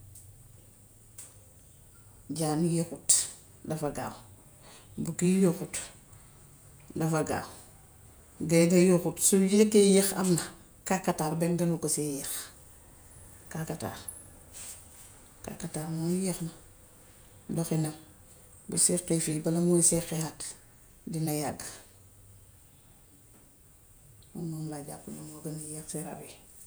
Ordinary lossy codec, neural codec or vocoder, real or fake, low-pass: none; vocoder, 48 kHz, 128 mel bands, Vocos; fake; none